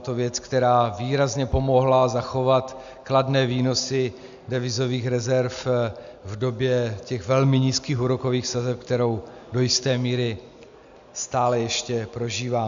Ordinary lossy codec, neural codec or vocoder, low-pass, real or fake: AAC, 96 kbps; none; 7.2 kHz; real